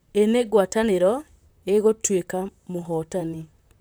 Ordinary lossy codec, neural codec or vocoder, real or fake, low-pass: none; vocoder, 44.1 kHz, 128 mel bands, Pupu-Vocoder; fake; none